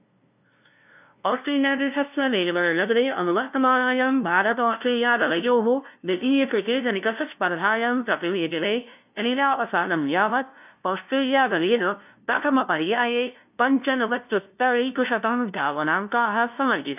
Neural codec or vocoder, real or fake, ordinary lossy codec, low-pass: codec, 16 kHz, 0.5 kbps, FunCodec, trained on LibriTTS, 25 frames a second; fake; none; 3.6 kHz